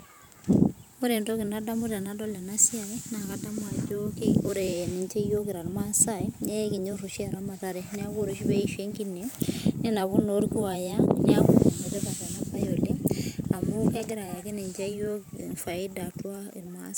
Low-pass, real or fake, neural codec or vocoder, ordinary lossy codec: none; fake; vocoder, 44.1 kHz, 128 mel bands every 512 samples, BigVGAN v2; none